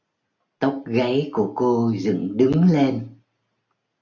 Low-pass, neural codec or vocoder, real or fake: 7.2 kHz; none; real